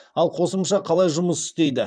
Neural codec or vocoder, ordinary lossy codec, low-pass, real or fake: vocoder, 44.1 kHz, 128 mel bands, Pupu-Vocoder; none; 9.9 kHz; fake